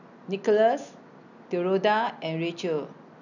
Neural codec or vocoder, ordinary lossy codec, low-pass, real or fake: none; none; 7.2 kHz; real